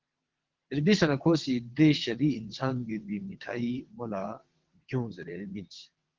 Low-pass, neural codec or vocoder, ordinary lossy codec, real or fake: 7.2 kHz; vocoder, 22.05 kHz, 80 mel bands, WaveNeXt; Opus, 16 kbps; fake